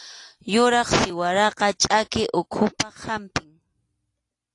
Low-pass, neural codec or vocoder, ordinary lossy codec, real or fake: 10.8 kHz; none; AAC, 48 kbps; real